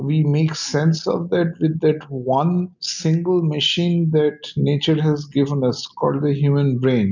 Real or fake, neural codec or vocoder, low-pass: real; none; 7.2 kHz